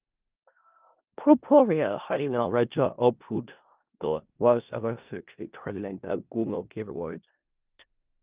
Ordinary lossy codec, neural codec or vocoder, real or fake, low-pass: Opus, 24 kbps; codec, 16 kHz in and 24 kHz out, 0.4 kbps, LongCat-Audio-Codec, four codebook decoder; fake; 3.6 kHz